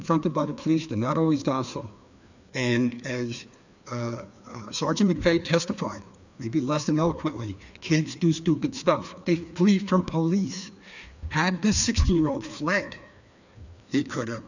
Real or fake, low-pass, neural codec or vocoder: fake; 7.2 kHz; codec, 16 kHz, 2 kbps, FreqCodec, larger model